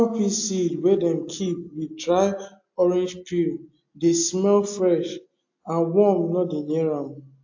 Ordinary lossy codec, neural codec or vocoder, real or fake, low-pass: AAC, 48 kbps; none; real; 7.2 kHz